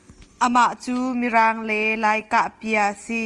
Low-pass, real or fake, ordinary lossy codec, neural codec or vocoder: 10.8 kHz; real; Opus, 24 kbps; none